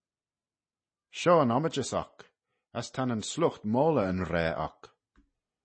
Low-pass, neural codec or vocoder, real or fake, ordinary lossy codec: 9.9 kHz; none; real; MP3, 32 kbps